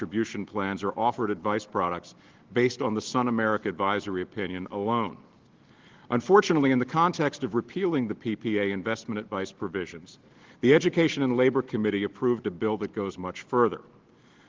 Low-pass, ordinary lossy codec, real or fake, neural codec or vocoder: 7.2 kHz; Opus, 16 kbps; real; none